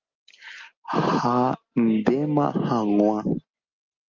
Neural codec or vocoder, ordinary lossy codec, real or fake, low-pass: none; Opus, 32 kbps; real; 7.2 kHz